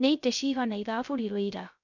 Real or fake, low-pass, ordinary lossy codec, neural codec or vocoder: fake; 7.2 kHz; none; codec, 16 kHz, 0.3 kbps, FocalCodec